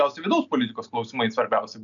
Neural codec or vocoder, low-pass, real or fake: none; 7.2 kHz; real